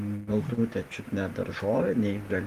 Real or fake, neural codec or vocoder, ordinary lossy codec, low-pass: fake; vocoder, 44.1 kHz, 128 mel bands every 512 samples, BigVGAN v2; Opus, 24 kbps; 14.4 kHz